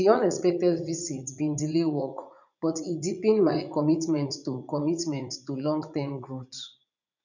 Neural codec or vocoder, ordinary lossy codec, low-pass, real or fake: vocoder, 44.1 kHz, 80 mel bands, Vocos; none; 7.2 kHz; fake